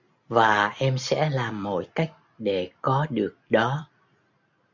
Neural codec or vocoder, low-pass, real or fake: none; 7.2 kHz; real